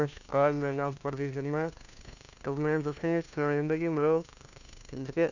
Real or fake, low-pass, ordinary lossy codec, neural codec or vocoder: fake; 7.2 kHz; none; codec, 16 kHz, 1 kbps, FunCodec, trained on LibriTTS, 50 frames a second